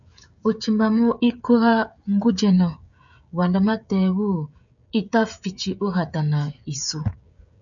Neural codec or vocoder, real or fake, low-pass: codec, 16 kHz, 16 kbps, FreqCodec, smaller model; fake; 7.2 kHz